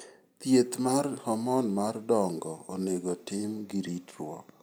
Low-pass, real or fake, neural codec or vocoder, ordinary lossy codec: none; real; none; none